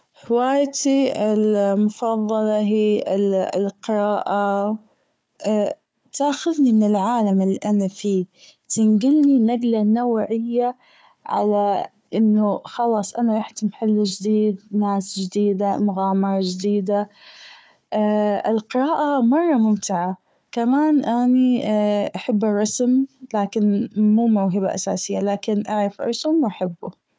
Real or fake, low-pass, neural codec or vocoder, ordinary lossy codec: fake; none; codec, 16 kHz, 4 kbps, FunCodec, trained on Chinese and English, 50 frames a second; none